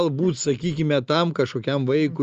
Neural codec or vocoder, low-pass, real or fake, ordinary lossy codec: none; 7.2 kHz; real; Opus, 24 kbps